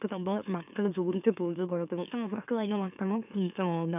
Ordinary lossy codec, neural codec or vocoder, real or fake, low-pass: none; autoencoder, 44.1 kHz, a latent of 192 numbers a frame, MeloTTS; fake; 3.6 kHz